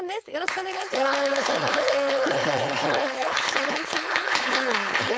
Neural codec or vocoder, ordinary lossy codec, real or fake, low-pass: codec, 16 kHz, 4.8 kbps, FACodec; none; fake; none